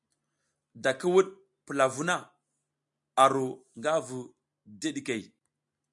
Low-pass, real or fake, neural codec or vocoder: 10.8 kHz; real; none